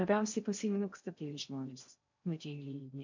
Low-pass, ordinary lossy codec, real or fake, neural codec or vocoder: 7.2 kHz; MP3, 64 kbps; fake; codec, 16 kHz in and 24 kHz out, 0.6 kbps, FocalCodec, streaming, 4096 codes